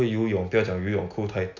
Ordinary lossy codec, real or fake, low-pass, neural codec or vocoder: none; real; 7.2 kHz; none